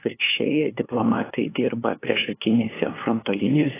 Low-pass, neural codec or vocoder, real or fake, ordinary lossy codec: 3.6 kHz; codec, 16 kHz, 2 kbps, FunCodec, trained on LibriTTS, 25 frames a second; fake; AAC, 16 kbps